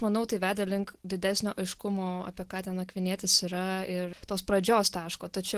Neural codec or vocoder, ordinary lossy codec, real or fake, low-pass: none; Opus, 16 kbps; real; 14.4 kHz